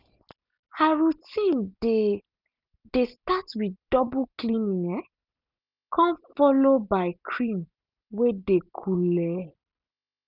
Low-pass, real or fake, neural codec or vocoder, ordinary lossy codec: 5.4 kHz; real; none; none